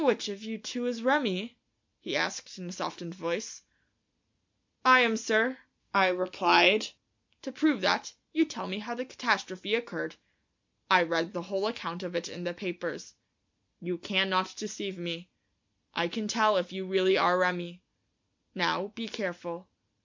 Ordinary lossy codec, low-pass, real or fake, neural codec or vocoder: MP3, 64 kbps; 7.2 kHz; real; none